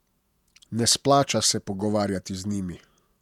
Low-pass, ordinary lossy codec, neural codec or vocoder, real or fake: 19.8 kHz; none; vocoder, 48 kHz, 128 mel bands, Vocos; fake